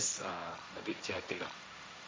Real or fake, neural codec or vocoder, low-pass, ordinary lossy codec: fake; codec, 16 kHz, 1.1 kbps, Voila-Tokenizer; none; none